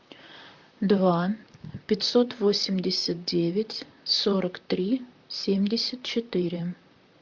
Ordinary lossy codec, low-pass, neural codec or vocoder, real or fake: MP3, 64 kbps; 7.2 kHz; vocoder, 44.1 kHz, 128 mel bands, Pupu-Vocoder; fake